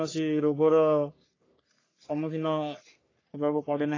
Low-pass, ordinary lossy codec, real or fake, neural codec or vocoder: 7.2 kHz; AAC, 32 kbps; fake; codec, 44.1 kHz, 3.4 kbps, Pupu-Codec